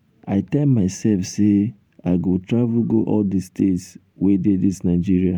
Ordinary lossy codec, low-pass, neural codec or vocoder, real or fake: none; 19.8 kHz; vocoder, 44.1 kHz, 128 mel bands every 512 samples, BigVGAN v2; fake